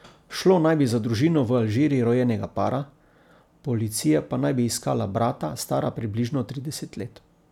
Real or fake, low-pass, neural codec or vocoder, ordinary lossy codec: real; 19.8 kHz; none; none